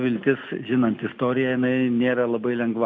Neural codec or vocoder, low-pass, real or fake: none; 7.2 kHz; real